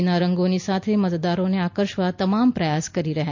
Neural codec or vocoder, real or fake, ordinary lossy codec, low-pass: none; real; MP3, 48 kbps; 7.2 kHz